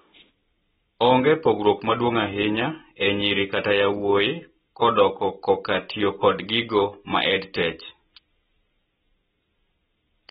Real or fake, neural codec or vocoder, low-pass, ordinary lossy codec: real; none; 19.8 kHz; AAC, 16 kbps